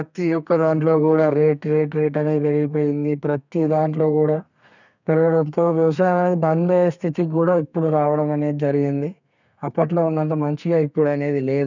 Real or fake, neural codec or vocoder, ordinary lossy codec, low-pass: fake; codec, 32 kHz, 1.9 kbps, SNAC; none; 7.2 kHz